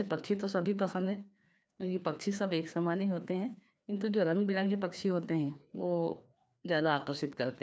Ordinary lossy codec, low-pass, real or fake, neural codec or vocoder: none; none; fake; codec, 16 kHz, 2 kbps, FreqCodec, larger model